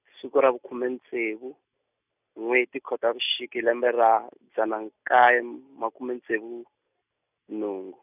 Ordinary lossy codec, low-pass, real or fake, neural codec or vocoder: none; 3.6 kHz; real; none